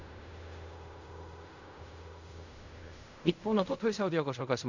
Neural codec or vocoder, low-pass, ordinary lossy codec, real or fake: codec, 16 kHz in and 24 kHz out, 0.4 kbps, LongCat-Audio-Codec, fine tuned four codebook decoder; 7.2 kHz; none; fake